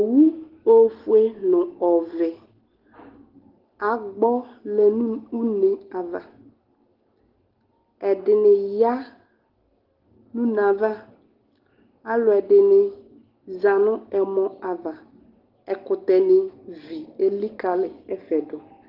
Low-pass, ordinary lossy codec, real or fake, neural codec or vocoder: 5.4 kHz; Opus, 16 kbps; real; none